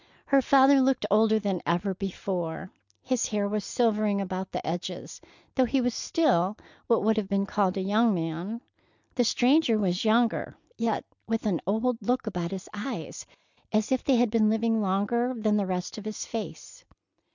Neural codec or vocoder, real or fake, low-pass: none; real; 7.2 kHz